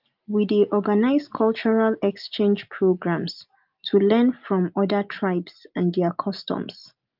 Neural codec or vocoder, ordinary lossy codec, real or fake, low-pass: none; Opus, 24 kbps; real; 5.4 kHz